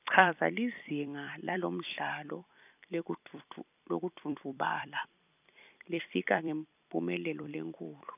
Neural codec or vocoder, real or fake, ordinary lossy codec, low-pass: vocoder, 44.1 kHz, 128 mel bands every 256 samples, BigVGAN v2; fake; none; 3.6 kHz